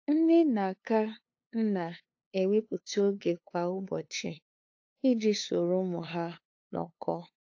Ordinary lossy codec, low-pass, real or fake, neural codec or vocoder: AAC, 48 kbps; 7.2 kHz; fake; codec, 16 kHz, 2 kbps, FunCodec, trained on LibriTTS, 25 frames a second